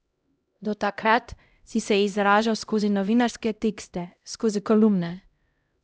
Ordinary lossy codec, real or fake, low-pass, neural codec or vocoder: none; fake; none; codec, 16 kHz, 0.5 kbps, X-Codec, HuBERT features, trained on LibriSpeech